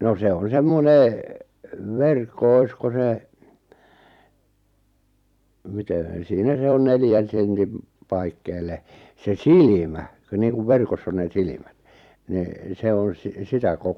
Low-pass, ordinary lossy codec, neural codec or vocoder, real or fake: 19.8 kHz; none; vocoder, 44.1 kHz, 128 mel bands every 256 samples, BigVGAN v2; fake